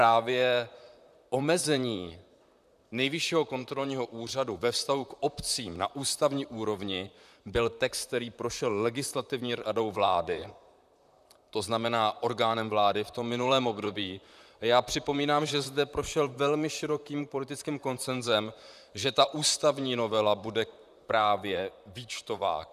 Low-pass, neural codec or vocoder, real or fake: 14.4 kHz; vocoder, 44.1 kHz, 128 mel bands, Pupu-Vocoder; fake